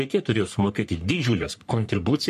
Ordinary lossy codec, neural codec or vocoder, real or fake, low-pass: MP3, 64 kbps; codec, 44.1 kHz, 3.4 kbps, Pupu-Codec; fake; 14.4 kHz